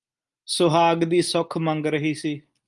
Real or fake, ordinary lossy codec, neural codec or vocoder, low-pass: real; Opus, 32 kbps; none; 10.8 kHz